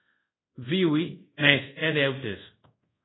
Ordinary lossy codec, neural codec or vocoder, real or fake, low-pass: AAC, 16 kbps; codec, 24 kHz, 0.5 kbps, DualCodec; fake; 7.2 kHz